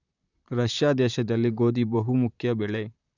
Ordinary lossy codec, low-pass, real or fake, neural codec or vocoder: none; 7.2 kHz; fake; vocoder, 44.1 kHz, 128 mel bands, Pupu-Vocoder